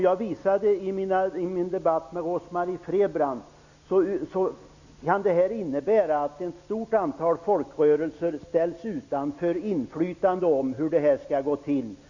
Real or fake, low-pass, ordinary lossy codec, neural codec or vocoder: real; 7.2 kHz; none; none